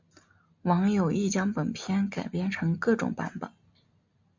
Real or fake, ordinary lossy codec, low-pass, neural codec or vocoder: real; MP3, 64 kbps; 7.2 kHz; none